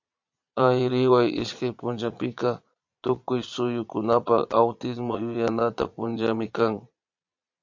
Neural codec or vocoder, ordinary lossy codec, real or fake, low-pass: vocoder, 22.05 kHz, 80 mel bands, Vocos; MP3, 48 kbps; fake; 7.2 kHz